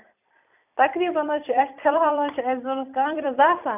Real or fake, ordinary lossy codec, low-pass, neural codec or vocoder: fake; none; 3.6 kHz; vocoder, 44.1 kHz, 128 mel bands every 256 samples, BigVGAN v2